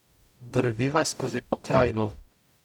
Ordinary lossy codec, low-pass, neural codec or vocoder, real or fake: none; 19.8 kHz; codec, 44.1 kHz, 0.9 kbps, DAC; fake